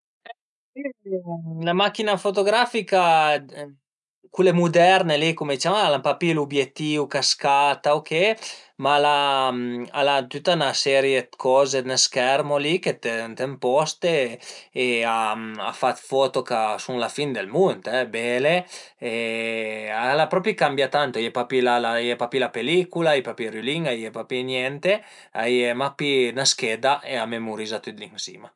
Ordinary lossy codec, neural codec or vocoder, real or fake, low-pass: none; none; real; 10.8 kHz